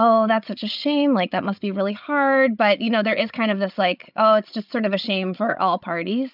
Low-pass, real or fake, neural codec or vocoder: 5.4 kHz; fake; codec, 16 kHz, 16 kbps, FreqCodec, larger model